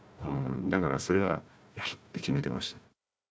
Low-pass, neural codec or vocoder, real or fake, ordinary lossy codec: none; codec, 16 kHz, 1 kbps, FunCodec, trained on Chinese and English, 50 frames a second; fake; none